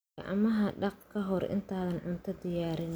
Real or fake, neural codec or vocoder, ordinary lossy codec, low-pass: real; none; none; none